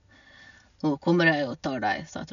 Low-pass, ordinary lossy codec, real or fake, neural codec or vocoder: 7.2 kHz; none; real; none